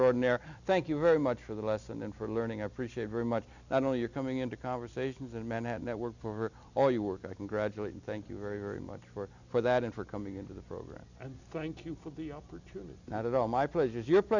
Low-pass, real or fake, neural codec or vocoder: 7.2 kHz; real; none